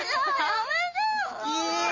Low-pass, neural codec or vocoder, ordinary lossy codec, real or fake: 7.2 kHz; none; MP3, 32 kbps; real